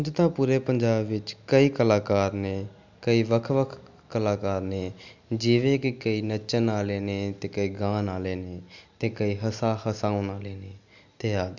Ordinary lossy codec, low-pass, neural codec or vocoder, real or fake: MP3, 64 kbps; 7.2 kHz; none; real